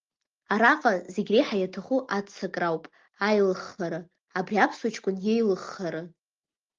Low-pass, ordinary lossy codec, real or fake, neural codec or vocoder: 7.2 kHz; Opus, 32 kbps; real; none